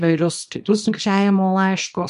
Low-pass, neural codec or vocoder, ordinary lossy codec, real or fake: 10.8 kHz; codec, 24 kHz, 0.9 kbps, WavTokenizer, small release; MP3, 64 kbps; fake